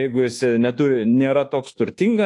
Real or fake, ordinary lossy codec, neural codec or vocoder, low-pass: fake; AAC, 48 kbps; codec, 24 kHz, 1.2 kbps, DualCodec; 10.8 kHz